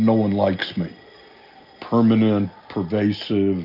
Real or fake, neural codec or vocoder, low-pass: real; none; 5.4 kHz